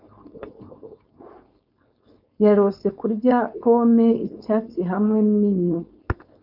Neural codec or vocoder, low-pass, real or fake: codec, 16 kHz, 4.8 kbps, FACodec; 5.4 kHz; fake